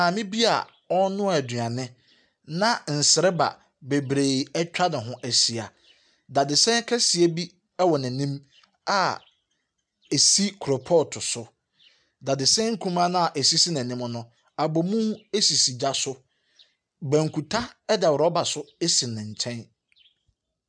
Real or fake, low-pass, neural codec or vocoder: real; 9.9 kHz; none